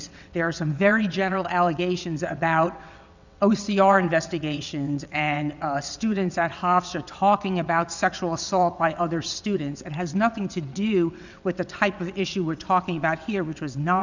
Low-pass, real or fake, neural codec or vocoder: 7.2 kHz; fake; vocoder, 22.05 kHz, 80 mel bands, WaveNeXt